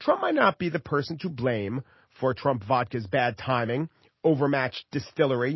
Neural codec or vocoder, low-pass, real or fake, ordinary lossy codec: none; 7.2 kHz; real; MP3, 24 kbps